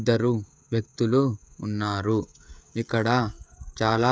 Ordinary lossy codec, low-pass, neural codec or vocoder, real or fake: none; none; codec, 16 kHz, 16 kbps, FreqCodec, smaller model; fake